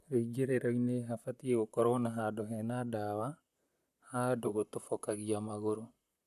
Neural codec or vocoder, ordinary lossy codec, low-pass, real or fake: vocoder, 44.1 kHz, 128 mel bands, Pupu-Vocoder; none; 14.4 kHz; fake